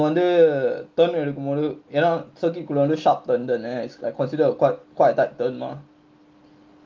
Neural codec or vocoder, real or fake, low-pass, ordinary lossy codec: none; real; 7.2 kHz; Opus, 32 kbps